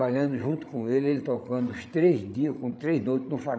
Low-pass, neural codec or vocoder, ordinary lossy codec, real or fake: none; codec, 16 kHz, 16 kbps, FreqCodec, larger model; none; fake